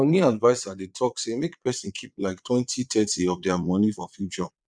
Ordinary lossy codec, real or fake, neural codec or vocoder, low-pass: none; fake; vocoder, 22.05 kHz, 80 mel bands, Vocos; 9.9 kHz